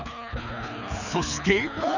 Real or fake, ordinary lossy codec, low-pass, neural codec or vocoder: fake; none; 7.2 kHz; codec, 24 kHz, 6 kbps, HILCodec